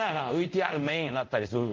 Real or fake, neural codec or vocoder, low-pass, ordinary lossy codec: fake; codec, 24 kHz, 0.5 kbps, DualCodec; 7.2 kHz; Opus, 24 kbps